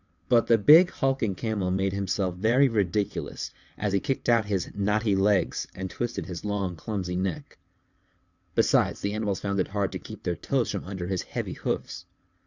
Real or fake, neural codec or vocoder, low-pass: fake; vocoder, 22.05 kHz, 80 mel bands, WaveNeXt; 7.2 kHz